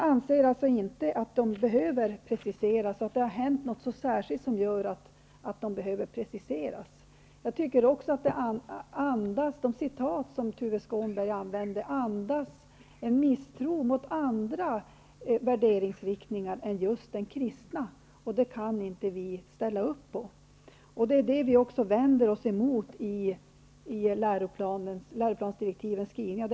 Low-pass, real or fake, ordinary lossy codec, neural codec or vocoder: none; real; none; none